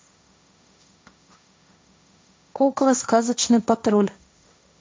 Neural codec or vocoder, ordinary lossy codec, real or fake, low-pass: codec, 16 kHz, 1.1 kbps, Voila-Tokenizer; none; fake; none